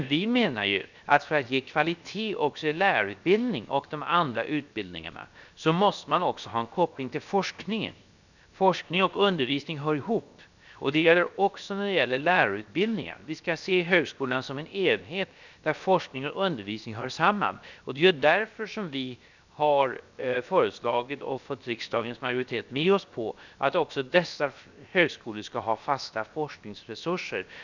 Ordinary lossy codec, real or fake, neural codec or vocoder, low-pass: none; fake; codec, 16 kHz, 0.7 kbps, FocalCodec; 7.2 kHz